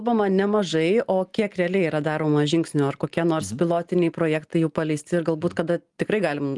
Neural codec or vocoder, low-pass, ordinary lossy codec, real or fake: none; 10.8 kHz; Opus, 24 kbps; real